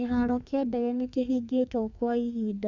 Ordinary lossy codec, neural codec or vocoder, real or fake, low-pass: none; codec, 32 kHz, 1.9 kbps, SNAC; fake; 7.2 kHz